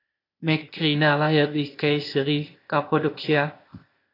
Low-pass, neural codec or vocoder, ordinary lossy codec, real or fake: 5.4 kHz; codec, 16 kHz, 0.8 kbps, ZipCodec; AAC, 32 kbps; fake